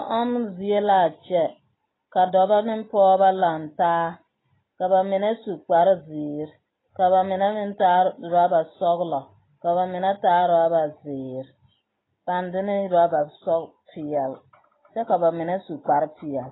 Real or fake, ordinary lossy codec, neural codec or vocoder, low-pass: real; AAC, 16 kbps; none; 7.2 kHz